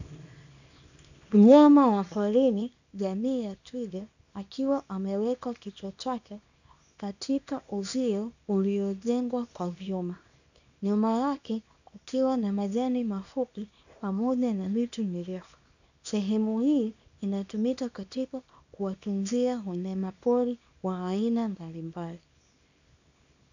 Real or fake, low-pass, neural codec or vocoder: fake; 7.2 kHz; codec, 24 kHz, 0.9 kbps, WavTokenizer, small release